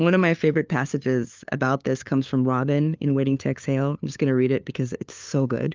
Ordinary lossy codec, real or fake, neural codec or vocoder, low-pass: Opus, 32 kbps; fake; codec, 16 kHz, 2 kbps, FunCodec, trained on LibriTTS, 25 frames a second; 7.2 kHz